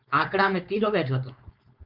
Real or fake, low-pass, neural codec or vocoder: fake; 5.4 kHz; codec, 16 kHz, 4.8 kbps, FACodec